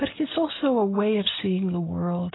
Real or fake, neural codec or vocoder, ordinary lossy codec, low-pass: real; none; AAC, 16 kbps; 7.2 kHz